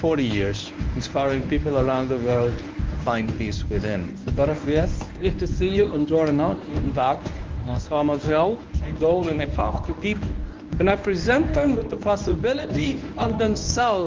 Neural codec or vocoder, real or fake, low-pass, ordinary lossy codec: codec, 24 kHz, 0.9 kbps, WavTokenizer, medium speech release version 1; fake; 7.2 kHz; Opus, 24 kbps